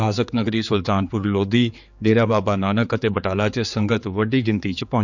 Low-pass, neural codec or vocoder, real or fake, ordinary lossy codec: 7.2 kHz; codec, 16 kHz, 4 kbps, X-Codec, HuBERT features, trained on general audio; fake; none